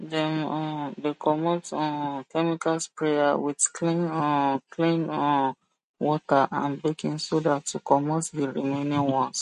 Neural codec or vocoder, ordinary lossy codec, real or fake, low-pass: none; MP3, 48 kbps; real; 14.4 kHz